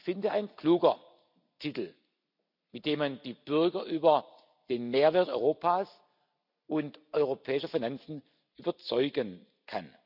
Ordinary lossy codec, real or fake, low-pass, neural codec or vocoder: none; real; 5.4 kHz; none